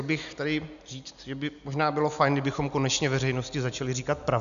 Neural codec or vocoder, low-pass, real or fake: none; 7.2 kHz; real